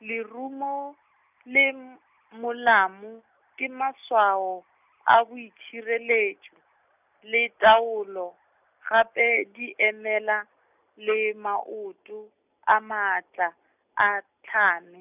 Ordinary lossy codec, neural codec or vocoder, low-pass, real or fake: none; none; 3.6 kHz; real